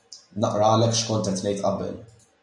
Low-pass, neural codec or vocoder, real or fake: 10.8 kHz; none; real